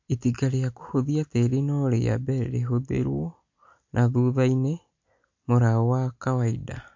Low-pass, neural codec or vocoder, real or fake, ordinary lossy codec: 7.2 kHz; none; real; MP3, 48 kbps